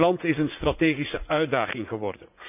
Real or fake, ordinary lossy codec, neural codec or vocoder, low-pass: fake; none; vocoder, 44.1 kHz, 80 mel bands, Vocos; 3.6 kHz